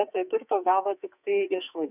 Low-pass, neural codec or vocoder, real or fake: 3.6 kHz; codec, 44.1 kHz, 2.6 kbps, SNAC; fake